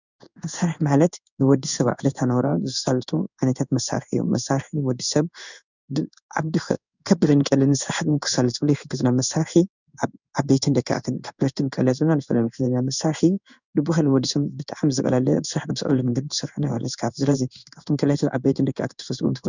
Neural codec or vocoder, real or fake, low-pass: codec, 16 kHz in and 24 kHz out, 1 kbps, XY-Tokenizer; fake; 7.2 kHz